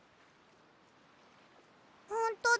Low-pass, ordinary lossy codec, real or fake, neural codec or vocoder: none; none; real; none